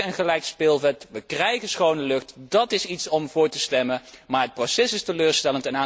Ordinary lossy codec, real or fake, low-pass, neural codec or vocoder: none; real; none; none